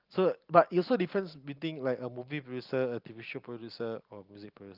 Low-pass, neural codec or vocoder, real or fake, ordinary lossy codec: 5.4 kHz; none; real; Opus, 32 kbps